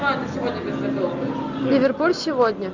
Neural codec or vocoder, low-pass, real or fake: none; 7.2 kHz; real